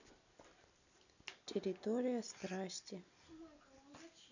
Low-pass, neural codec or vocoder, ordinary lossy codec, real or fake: 7.2 kHz; none; none; real